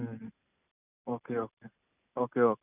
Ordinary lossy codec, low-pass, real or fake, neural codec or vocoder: none; 3.6 kHz; real; none